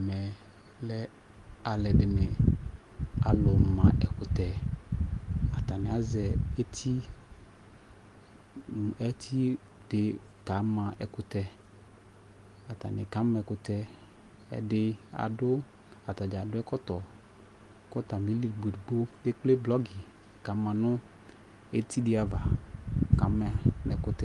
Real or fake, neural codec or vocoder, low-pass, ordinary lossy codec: real; none; 10.8 kHz; Opus, 24 kbps